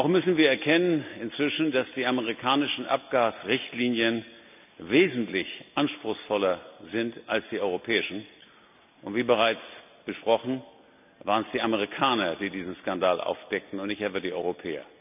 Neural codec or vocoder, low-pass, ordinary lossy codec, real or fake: none; 3.6 kHz; none; real